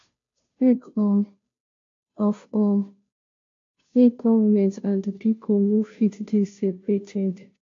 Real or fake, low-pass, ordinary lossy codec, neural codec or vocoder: fake; 7.2 kHz; AAC, 48 kbps; codec, 16 kHz, 0.5 kbps, FunCodec, trained on Chinese and English, 25 frames a second